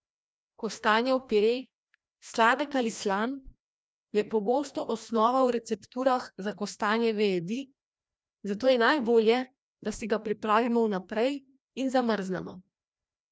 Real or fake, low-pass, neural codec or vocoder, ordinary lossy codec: fake; none; codec, 16 kHz, 1 kbps, FreqCodec, larger model; none